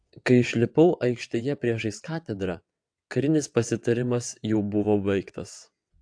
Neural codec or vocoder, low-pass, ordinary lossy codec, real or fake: vocoder, 22.05 kHz, 80 mel bands, WaveNeXt; 9.9 kHz; AAC, 64 kbps; fake